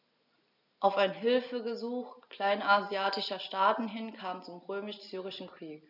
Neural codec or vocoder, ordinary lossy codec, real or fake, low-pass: none; none; real; 5.4 kHz